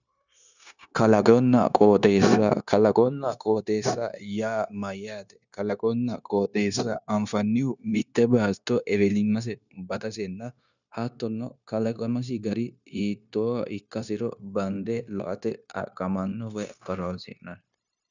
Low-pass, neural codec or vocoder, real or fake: 7.2 kHz; codec, 16 kHz, 0.9 kbps, LongCat-Audio-Codec; fake